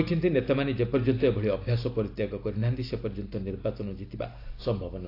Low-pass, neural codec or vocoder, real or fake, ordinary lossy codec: 5.4 kHz; none; real; AAC, 32 kbps